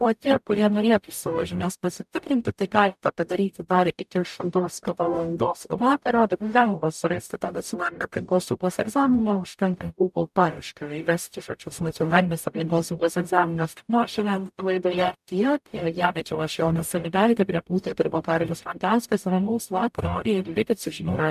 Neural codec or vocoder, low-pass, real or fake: codec, 44.1 kHz, 0.9 kbps, DAC; 14.4 kHz; fake